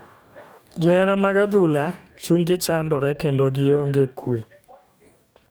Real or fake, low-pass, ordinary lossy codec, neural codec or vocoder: fake; none; none; codec, 44.1 kHz, 2.6 kbps, DAC